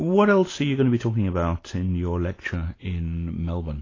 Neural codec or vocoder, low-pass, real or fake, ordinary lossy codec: none; 7.2 kHz; real; AAC, 32 kbps